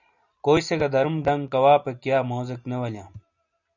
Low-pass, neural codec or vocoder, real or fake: 7.2 kHz; none; real